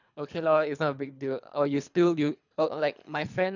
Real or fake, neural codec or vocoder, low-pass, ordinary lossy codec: fake; codec, 24 kHz, 3 kbps, HILCodec; 7.2 kHz; none